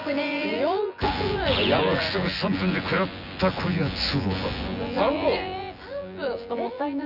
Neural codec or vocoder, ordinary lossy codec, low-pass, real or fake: vocoder, 24 kHz, 100 mel bands, Vocos; none; 5.4 kHz; fake